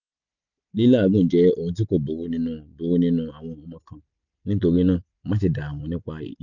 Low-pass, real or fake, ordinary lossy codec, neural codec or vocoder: 7.2 kHz; real; none; none